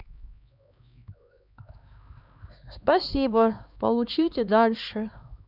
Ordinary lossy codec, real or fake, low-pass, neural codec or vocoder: none; fake; 5.4 kHz; codec, 16 kHz, 2 kbps, X-Codec, HuBERT features, trained on LibriSpeech